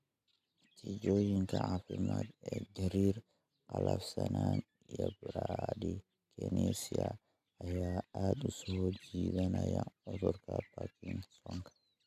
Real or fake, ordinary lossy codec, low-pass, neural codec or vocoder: real; AAC, 96 kbps; 14.4 kHz; none